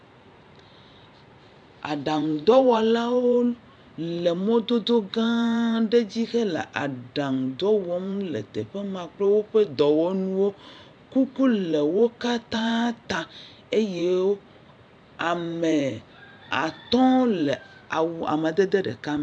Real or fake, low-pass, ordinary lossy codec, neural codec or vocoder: fake; 9.9 kHz; MP3, 96 kbps; vocoder, 44.1 kHz, 128 mel bands every 512 samples, BigVGAN v2